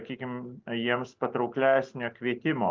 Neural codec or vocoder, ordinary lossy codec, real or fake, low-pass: none; Opus, 24 kbps; real; 7.2 kHz